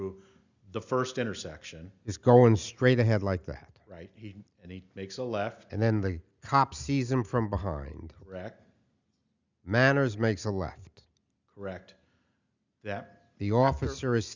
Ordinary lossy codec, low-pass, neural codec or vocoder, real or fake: Opus, 64 kbps; 7.2 kHz; none; real